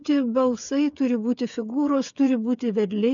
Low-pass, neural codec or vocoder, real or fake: 7.2 kHz; codec, 16 kHz, 8 kbps, FreqCodec, smaller model; fake